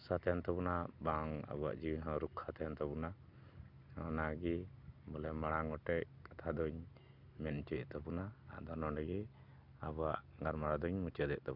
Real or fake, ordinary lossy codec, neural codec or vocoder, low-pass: real; none; none; 5.4 kHz